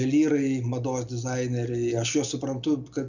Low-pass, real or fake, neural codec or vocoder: 7.2 kHz; real; none